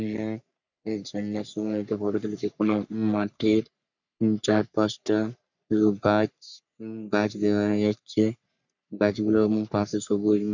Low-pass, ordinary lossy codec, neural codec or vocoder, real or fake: 7.2 kHz; none; codec, 44.1 kHz, 3.4 kbps, Pupu-Codec; fake